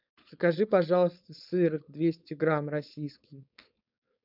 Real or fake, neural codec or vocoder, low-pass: fake; codec, 16 kHz, 4.8 kbps, FACodec; 5.4 kHz